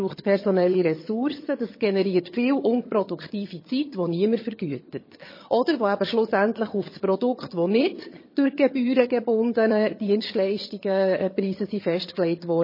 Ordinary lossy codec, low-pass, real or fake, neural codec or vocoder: MP3, 24 kbps; 5.4 kHz; fake; vocoder, 22.05 kHz, 80 mel bands, HiFi-GAN